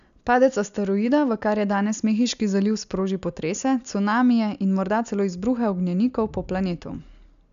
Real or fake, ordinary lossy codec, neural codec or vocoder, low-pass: real; none; none; 7.2 kHz